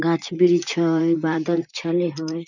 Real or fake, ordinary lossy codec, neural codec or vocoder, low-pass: fake; none; vocoder, 44.1 kHz, 128 mel bands, Pupu-Vocoder; 7.2 kHz